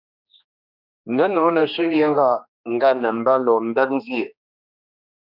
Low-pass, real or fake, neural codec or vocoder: 5.4 kHz; fake; codec, 16 kHz, 2 kbps, X-Codec, HuBERT features, trained on general audio